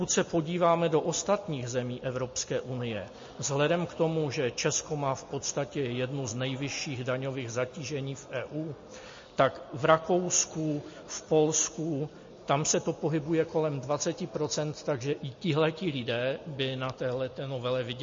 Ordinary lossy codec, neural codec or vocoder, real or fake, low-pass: MP3, 32 kbps; none; real; 7.2 kHz